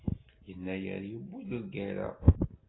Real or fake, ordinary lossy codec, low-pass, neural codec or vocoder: real; AAC, 16 kbps; 7.2 kHz; none